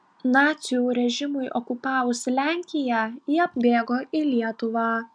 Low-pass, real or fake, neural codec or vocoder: 9.9 kHz; real; none